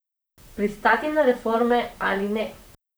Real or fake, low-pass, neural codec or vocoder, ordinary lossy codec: fake; none; vocoder, 44.1 kHz, 128 mel bands, Pupu-Vocoder; none